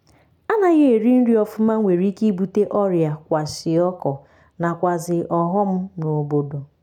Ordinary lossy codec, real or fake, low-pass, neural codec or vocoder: none; real; 19.8 kHz; none